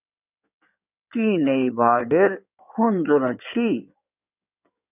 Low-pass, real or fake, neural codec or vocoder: 3.6 kHz; fake; codec, 16 kHz in and 24 kHz out, 2.2 kbps, FireRedTTS-2 codec